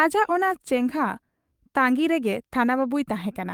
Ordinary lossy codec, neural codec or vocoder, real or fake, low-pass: Opus, 32 kbps; codec, 44.1 kHz, 7.8 kbps, DAC; fake; 19.8 kHz